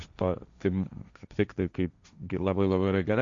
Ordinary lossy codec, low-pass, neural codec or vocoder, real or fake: Opus, 64 kbps; 7.2 kHz; codec, 16 kHz, 1.1 kbps, Voila-Tokenizer; fake